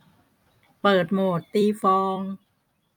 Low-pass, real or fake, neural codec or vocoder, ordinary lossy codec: 19.8 kHz; fake; vocoder, 44.1 kHz, 128 mel bands every 256 samples, BigVGAN v2; none